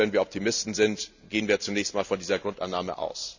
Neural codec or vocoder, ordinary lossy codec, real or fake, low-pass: none; none; real; 7.2 kHz